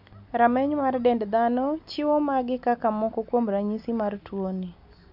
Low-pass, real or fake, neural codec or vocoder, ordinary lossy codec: 5.4 kHz; real; none; none